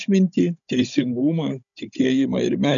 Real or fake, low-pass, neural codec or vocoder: fake; 7.2 kHz; codec, 16 kHz, 16 kbps, FunCodec, trained on Chinese and English, 50 frames a second